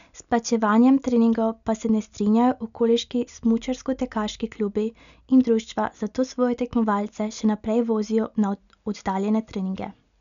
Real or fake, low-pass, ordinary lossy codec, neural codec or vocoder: real; 7.2 kHz; none; none